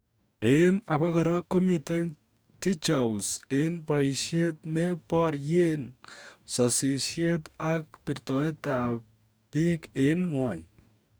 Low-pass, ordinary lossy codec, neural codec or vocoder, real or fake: none; none; codec, 44.1 kHz, 2.6 kbps, DAC; fake